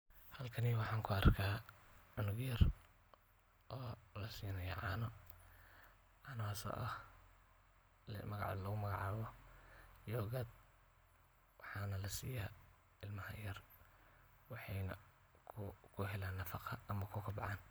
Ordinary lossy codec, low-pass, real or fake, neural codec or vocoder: none; none; real; none